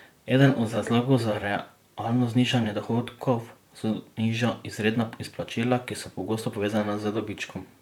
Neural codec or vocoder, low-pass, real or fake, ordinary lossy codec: vocoder, 44.1 kHz, 128 mel bands, Pupu-Vocoder; 19.8 kHz; fake; none